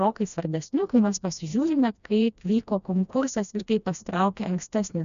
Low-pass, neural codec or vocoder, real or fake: 7.2 kHz; codec, 16 kHz, 1 kbps, FreqCodec, smaller model; fake